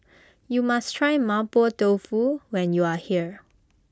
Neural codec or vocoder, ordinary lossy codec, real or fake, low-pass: none; none; real; none